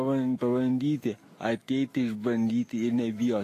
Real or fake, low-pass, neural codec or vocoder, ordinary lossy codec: fake; 14.4 kHz; autoencoder, 48 kHz, 128 numbers a frame, DAC-VAE, trained on Japanese speech; AAC, 48 kbps